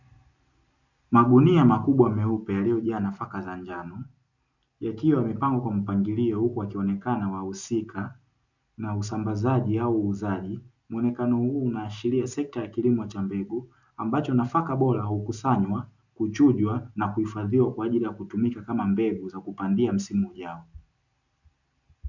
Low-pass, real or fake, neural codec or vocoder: 7.2 kHz; real; none